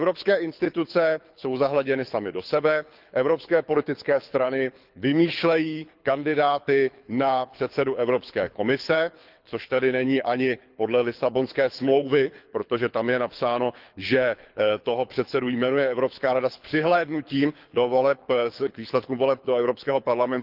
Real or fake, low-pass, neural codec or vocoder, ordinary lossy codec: fake; 5.4 kHz; codec, 24 kHz, 6 kbps, HILCodec; Opus, 24 kbps